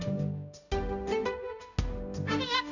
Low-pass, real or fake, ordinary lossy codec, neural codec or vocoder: 7.2 kHz; fake; none; codec, 16 kHz, 0.5 kbps, X-Codec, HuBERT features, trained on balanced general audio